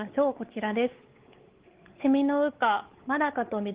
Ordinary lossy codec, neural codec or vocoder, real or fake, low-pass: Opus, 16 kbps; codec, 16 kHz, 4 kbps, X-Codec, WavLM features, trained on Multilingual LibriSpeech; fake; 3.6 kHz